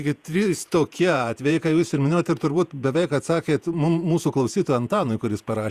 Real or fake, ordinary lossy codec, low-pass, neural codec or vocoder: fake; Opus, 64 kbps; 14.4 kHz; vocoder, 48 kHz, 128 mel bands, Vocos